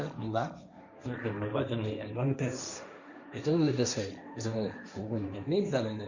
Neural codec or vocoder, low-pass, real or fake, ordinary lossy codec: codec, 16 kHz, 1.1 kbps, Voila-Tokenizer; 7.2 kHz; fake; Opus, 64 kbps